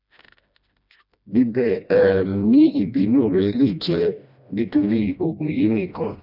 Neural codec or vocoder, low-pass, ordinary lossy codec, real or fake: codec, 16 kHz, 1 kbps, FreqCodec, smaller model; 5.4 kHz; none; fake